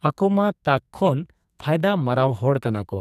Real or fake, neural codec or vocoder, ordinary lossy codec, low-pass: fake; codec, 44.1 kHz, 2.6 kbps, SNAC; none; 14.4 kHz